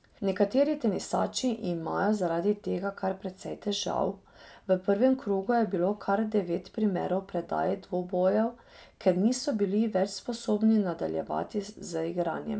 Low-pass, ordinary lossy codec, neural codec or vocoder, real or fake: none; none; none; real